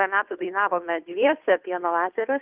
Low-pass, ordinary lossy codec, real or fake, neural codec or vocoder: 3.6 kHz; Opus, 24 kbps; fake; codec, 16 kHz, 2 kbps, FunCodec, trained on Chinese and English, 25 frames a second